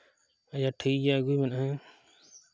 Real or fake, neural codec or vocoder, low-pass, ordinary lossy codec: real; none; none; none